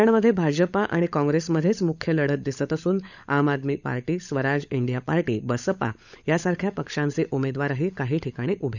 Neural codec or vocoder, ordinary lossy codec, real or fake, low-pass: codec, 16 kHz, 16 kbps, FunCodec, trained on LibriTTS, 50 frames a second; none; fake; 7.2 kHz